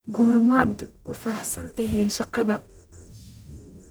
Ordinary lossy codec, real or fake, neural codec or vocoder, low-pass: none; fake; codec, 44.1 kHz, 0.9 kbps, DAC; none